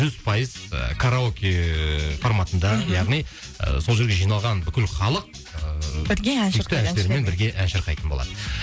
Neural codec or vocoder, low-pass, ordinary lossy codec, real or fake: none; none; none; real